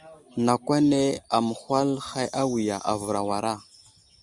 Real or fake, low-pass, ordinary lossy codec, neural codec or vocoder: real; 10.8 kHz; Opus, 64 kbps; none